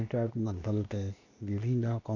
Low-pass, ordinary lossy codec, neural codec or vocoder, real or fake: 7.2 kHz; none; codec, 16 kHz, 0.8 kbps, ZipCodec; fake